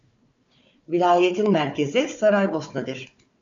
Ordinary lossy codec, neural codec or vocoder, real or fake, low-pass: MP3, 48 kbps; codec, 16 kHz, 4 kbps, FunCodec, trained on Chinese and English, 50 frames a second; fake; 7.2 kHz